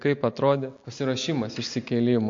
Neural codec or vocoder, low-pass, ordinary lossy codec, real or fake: none; 7.2 kHz; MP3, 48 kbps; real